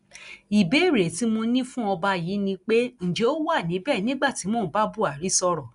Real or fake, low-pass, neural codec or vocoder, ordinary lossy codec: real; 10.8 kHz; none; none